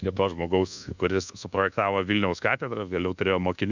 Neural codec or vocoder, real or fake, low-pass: codec, 24 kHz, 1.2 kbps, DualCodec; fake; 7.2 kHz